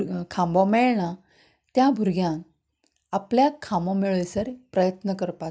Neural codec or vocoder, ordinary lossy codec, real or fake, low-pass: none; none; real; none